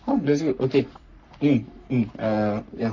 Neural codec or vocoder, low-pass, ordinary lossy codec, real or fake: codec, 44.1 kHz, 3.4 kbps, Pupu-Codec; 7.2 kHz; none; fake